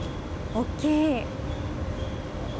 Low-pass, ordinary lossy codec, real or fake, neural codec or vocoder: none; none; real; none